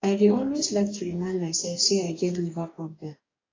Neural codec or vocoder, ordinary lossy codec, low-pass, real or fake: codec, 44.1 kHz, 2.6 kbps, DAC; AAC, 32 kbps; 7.2 kHz; fake